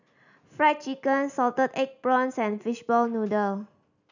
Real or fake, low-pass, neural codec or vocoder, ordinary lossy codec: real; 7.2 kHz; none; none